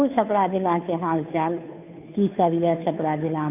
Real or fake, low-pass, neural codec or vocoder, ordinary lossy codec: fake; 3.6 kHz; codec, 16 kHz, 2 kbps, FunCodec, trained on Chinese and English, 25 frames a second; none